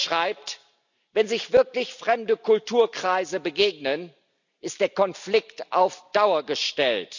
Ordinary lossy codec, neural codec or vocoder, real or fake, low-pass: none; none; real; 7.2 kHz